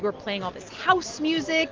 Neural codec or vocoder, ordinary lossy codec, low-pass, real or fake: none; Opus, 16 kbps; 7.2 kHz; real